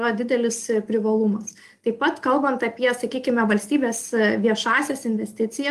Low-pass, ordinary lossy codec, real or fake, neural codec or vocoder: 14.4 kHz; Opus, 32 kbps; real; none